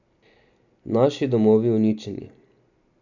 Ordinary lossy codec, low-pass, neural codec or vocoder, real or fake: none; 7.2 kHz; none; real